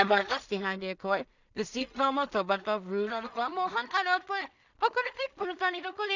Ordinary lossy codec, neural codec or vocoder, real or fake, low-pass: none; codec, 16 kHz in and 24 kHz out, 0.4 kbps, LongCat-Audio-Codec, two codebook decoder; fake; 7.2 kHz